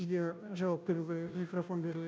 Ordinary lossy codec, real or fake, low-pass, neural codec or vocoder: none; fake; none; codec, 16 kHz, 0.5 kbps, FunCodec, trained on Chinese and English, 25 frames a second